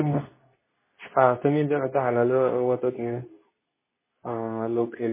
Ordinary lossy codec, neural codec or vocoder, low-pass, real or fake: MP3, 16 kbps; codec, 24 kHz, 0.9 kbps, WavTokenizer, medium speech release version 2; 3.6 kHz; fake